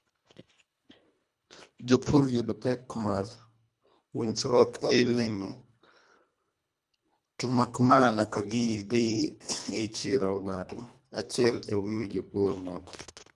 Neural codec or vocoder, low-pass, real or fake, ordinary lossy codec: codec, 24 kHz, 1.5 kbps, HILCodec; none; fake; none